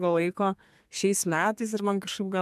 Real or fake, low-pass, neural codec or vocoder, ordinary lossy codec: fake; 14.4 kHz; codec, 32 kHz, 1.9 kbps, SNAC; MP3, 96 kbps